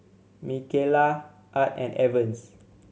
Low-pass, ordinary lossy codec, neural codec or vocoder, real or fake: none; none; none; real